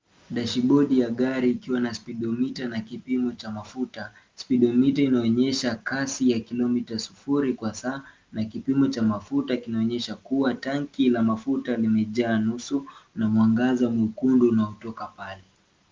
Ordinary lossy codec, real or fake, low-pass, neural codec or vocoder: Opus, 32 kbps; real; 7.2 kHz; none